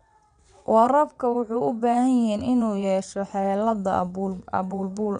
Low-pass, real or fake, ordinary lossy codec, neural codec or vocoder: 9.9 kHz; fake; none; vocoder, 22.05 kHz, 80 mel bands, WaveNeXt